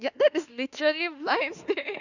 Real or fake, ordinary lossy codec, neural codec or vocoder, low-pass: fake; none; autoencoder, 48 kHz, 32 numbers a frame, DAC-VAE, trained on Japanese speech; 7.2 kHz